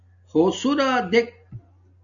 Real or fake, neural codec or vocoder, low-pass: real; none; 7.2 kHz